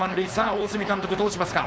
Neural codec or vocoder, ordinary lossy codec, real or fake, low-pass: codec, 16 kHz, 4.8 kbps, FACodec; none; fake; none